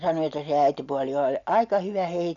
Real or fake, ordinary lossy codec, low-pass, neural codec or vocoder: real; none; 7.2 kHz; none